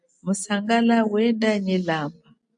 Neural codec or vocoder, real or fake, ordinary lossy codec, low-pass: none; real; AAC, 64 kbps; 9.9 kHz